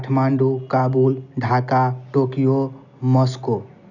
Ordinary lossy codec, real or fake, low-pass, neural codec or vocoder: none; real; 7.2 kHz; none